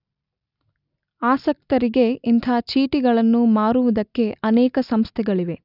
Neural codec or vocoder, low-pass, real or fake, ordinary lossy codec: none; 5.4 kHz; real; none